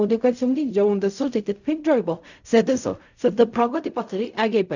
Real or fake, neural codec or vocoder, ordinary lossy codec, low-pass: fake; codec, 16 kHz in and 24 kHz out, 0.4 kbps, LongCat-Audio-Codec, fine tuned four codebook decoder; none; 7.2 kHz